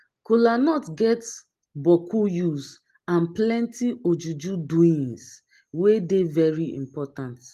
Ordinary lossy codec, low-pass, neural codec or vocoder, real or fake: Opus, 24 kbps; 14.4 kHz; none; real